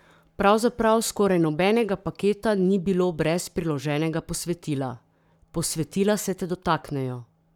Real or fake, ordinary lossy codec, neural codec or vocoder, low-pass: fake; none; codec, 44.1 kHz, 7.8 kbps, Pupu-Codec; 19.8 kHz